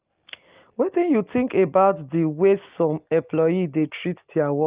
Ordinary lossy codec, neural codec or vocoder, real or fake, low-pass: Opus, 32 kbps; none; real; 3.6 kHz